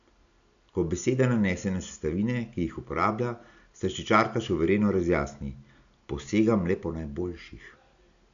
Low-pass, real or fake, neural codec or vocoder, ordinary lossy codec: 7.2 kHz; real; none; none